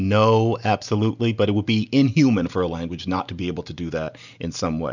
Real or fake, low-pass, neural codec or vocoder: real; 7.2 kHz; none